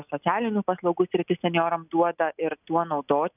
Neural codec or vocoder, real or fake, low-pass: none; real; 3.6 kHz